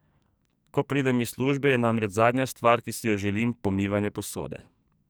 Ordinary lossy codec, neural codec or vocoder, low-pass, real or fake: none; codec, 44.1 kHz, 2.6 kbps, SNAC; none; fake